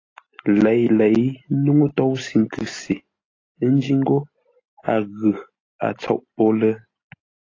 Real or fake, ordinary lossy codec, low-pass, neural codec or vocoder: real; AAC, 32 kbps; 7.2 kHz; none